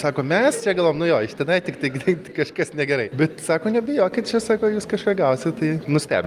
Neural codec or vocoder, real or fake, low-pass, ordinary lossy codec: none; real; 14.4 kHz; Opus, 32 kbps